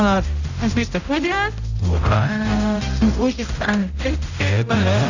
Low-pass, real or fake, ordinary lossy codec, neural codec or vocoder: 7.2 kHz; fake; none; codec, 16 kHz, 0.5 kbps, X-Codec, HuBERT features, trained on general audio